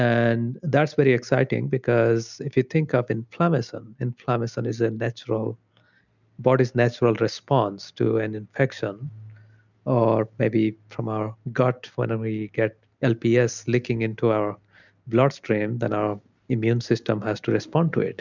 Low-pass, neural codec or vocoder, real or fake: 7.2 kHz; none; real